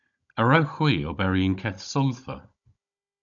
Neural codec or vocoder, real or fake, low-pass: codec, 16 kHz, 16 kbps, FunCodec, trained on Chinese and English, 50 frames a second; fake; 7.2 kHz